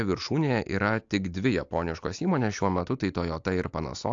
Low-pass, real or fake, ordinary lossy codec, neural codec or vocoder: 7.2 kHz; real; AAC, 64 kbps; none